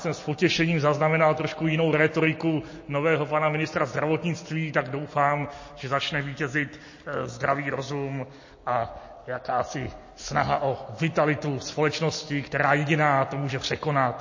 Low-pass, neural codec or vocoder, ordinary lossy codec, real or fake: 7.2 kHz; none; MP3, 32 kbps; real